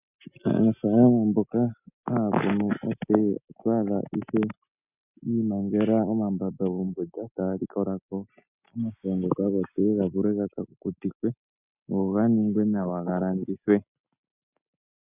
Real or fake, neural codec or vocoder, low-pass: real; none; 3.6 kHz